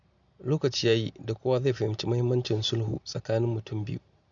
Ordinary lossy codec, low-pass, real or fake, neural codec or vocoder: none; 7.2 kHz; real; none